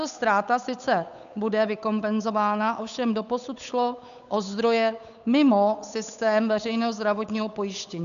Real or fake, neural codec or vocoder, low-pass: fake; codec, 16 kHz, 8 kbps, FunCodec, trained on Chinese and English, 25 frames a second; 7.2 kHz